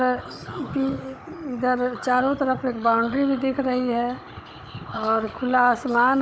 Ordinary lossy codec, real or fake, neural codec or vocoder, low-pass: none; fake; codec, 16 kHz, 16 kbps, FunCodec, trained on Chinese and English, 50 frames a second; none